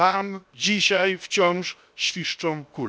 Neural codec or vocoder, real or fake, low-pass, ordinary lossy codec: codec, 16 kHz, 0.7 kbps, FocalCodec; fake; none; none